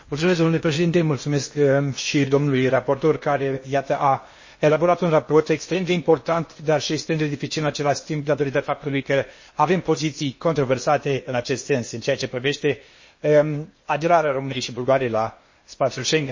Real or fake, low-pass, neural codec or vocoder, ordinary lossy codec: fake; 7.2 kHz; codec, 16 kHz in and 24 kHz out, 0.8 kbps, FocalCodec, streaming, 65536 codes; MP3, 32 kbps